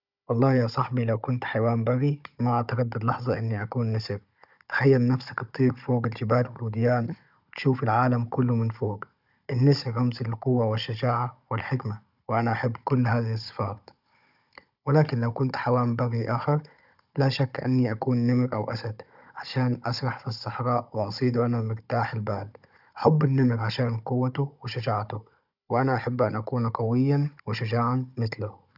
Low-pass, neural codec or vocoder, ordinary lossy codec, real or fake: 5.4 kHz; codec, 16 kHz, 16 kbps, FunCodec, trained on Chinese and English, 50 frames a second; none; fake